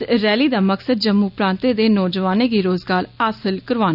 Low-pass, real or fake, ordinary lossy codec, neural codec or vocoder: 5.4 kHz; real; none; none